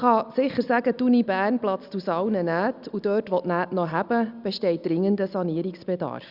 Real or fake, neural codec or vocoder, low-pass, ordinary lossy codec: real; none; 5.4 kHz; Opus, 64 kbps